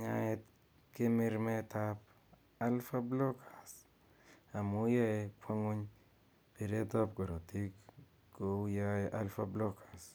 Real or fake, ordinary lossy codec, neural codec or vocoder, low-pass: real; none; none; none